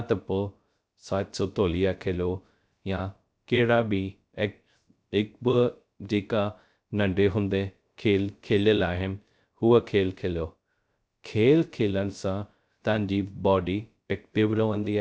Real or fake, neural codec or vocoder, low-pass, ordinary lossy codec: fake; codec, 16 kHz, 0.3 kbps, FocalCodec; none; none